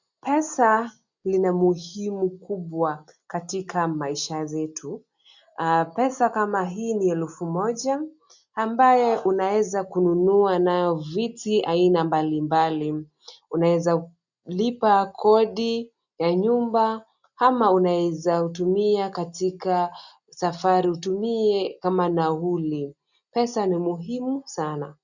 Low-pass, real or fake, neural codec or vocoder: 7.2 kHz; real; none